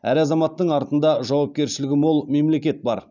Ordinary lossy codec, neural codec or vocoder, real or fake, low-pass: none; none; real; 7.2 kHz